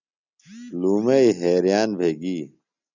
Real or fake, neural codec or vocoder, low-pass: real; none; 7.2 kHz